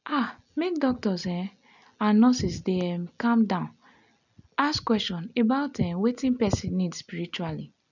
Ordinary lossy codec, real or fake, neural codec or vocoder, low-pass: none; real; none; 7.2 kHz